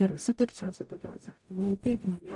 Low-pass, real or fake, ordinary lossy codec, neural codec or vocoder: 10.8 kHz; fake; AAC, 64 kbps; codec, 44.1 kHz, 0.9 kbps, DAC